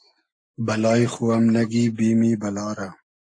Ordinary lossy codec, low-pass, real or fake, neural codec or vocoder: AAC, 48 kbps; 9.9 kHz; real; none